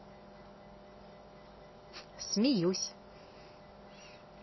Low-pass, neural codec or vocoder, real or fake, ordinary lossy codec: 7.2 kHz; none; real; MP3, 24 kbps